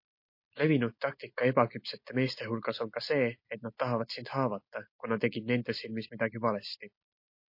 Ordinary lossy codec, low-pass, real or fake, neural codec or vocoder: MP3, 32 kbps; 5.4 kHz; real; none